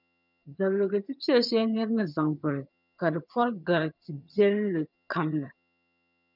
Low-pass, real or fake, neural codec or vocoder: 5.4 kHz; fake; vocoder, 22.05 kHz, 80 mel bands, HiFi-GAN